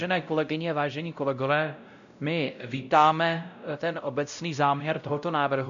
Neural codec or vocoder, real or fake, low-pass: codec, 16 kHz, 0.5 kbps, X-Codec, WavLM features, trained on Multilingual LibriSpeech; fake; 7.2 kHz